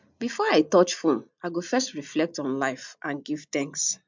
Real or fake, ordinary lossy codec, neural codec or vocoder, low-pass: real; MP3, 64 kbps; none; 7.2 kHz